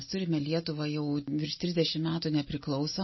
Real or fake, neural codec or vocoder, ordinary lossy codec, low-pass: real; none; MP3, 24 kbps; 7.2 kHz